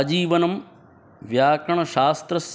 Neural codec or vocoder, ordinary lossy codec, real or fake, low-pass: none; none; real; none